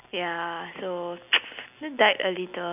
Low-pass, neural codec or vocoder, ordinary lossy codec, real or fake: 3.6 kHz; none; none; real